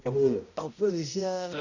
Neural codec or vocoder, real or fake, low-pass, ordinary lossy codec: codec, 16 kHz, 0.5 kbps, X-Codec, HuBERT features, trained on balanced general audio; fake; 7.2 kHz; none